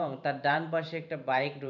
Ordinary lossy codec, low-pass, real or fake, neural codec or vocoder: none; 7.2 kHz; fake; vocoder, 44.1 kHz, 128 mel bands every 512 samples, BigVGAN v2